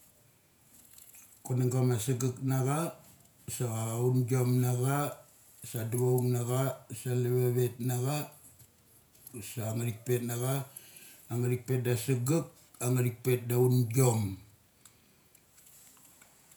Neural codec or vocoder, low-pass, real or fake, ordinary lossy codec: vocoder, 48 kHz, 128 mel bands, Vocos; none; fake; none